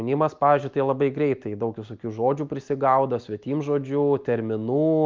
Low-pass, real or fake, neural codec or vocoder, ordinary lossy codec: 7.2 kHz; real; none; Opus, 24 kbps